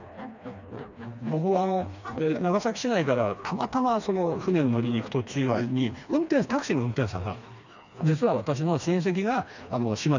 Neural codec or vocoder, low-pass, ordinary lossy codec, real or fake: codec, 16 kHz, 2 kbps, FreqCodec, smaller model; 7.2 kHz; none; fake